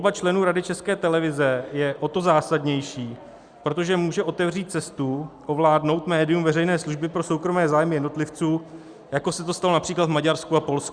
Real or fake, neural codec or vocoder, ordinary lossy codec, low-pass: real; none; Opus, 64 kbps; 9.9 kHz